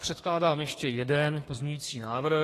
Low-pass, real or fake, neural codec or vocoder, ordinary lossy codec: 14.4 kHz; fake; codec, 32 kHz, 1.9 kbps, SNAC; AAC, 48 kbps